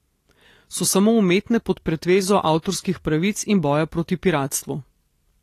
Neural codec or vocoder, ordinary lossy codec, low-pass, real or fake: none; AAC, 48 kbps; 14.4 kHz; real